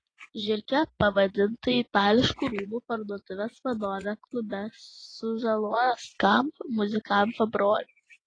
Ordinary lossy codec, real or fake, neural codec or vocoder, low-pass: AAC, 32 kbps; fake; vocoder, 24 kHz, 100 mel bands, Vocos; 9.9 kHz